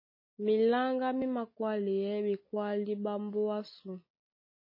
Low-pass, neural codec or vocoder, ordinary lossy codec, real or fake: 5.4 kHz; none; MP3, 24 kbps; real